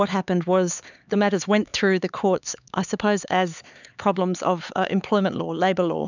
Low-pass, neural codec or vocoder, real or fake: 7.2 kHz; codec, 16 kHz, 4 kbps, X-Codec, HuBERT features, trained on LibriSpeech; fake